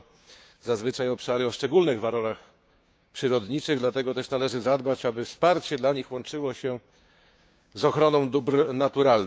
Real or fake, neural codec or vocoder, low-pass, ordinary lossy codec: fake; codec, 16 kHz, 6 kbps, DAC; none; none